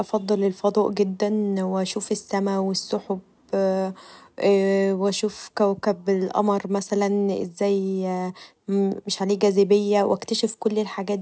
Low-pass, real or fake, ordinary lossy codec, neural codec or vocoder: none; real; none; none